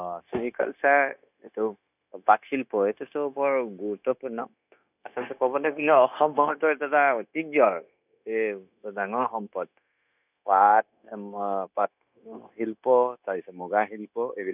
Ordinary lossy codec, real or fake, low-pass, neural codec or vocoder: none; fake; 3.6 kHz; codec, 16 kHz, 0.9 kbps, LongCat-Audio-Codec